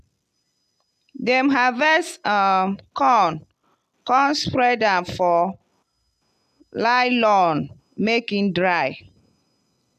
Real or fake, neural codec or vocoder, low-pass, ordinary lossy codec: real; none; 14.4 kHz; AAC, 96 kbps